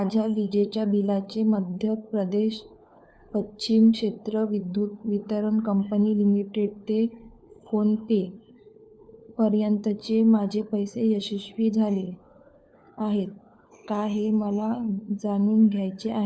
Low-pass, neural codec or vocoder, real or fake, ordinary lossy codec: none; codec, 16 kHz, 4 kbps, FunCodec, trained on LibriTTS, 50 frames a second; fake; none